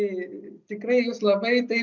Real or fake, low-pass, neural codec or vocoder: real; 7.2 kHz; none